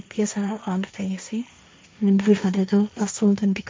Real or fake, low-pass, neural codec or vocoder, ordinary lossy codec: fake; none; codec, 16 kHz, 1.1 kbps, Voila-Tokenizer; none